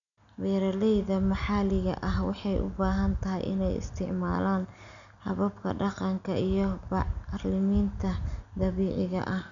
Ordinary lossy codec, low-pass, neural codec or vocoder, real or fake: none; 7.2 kHz; none; real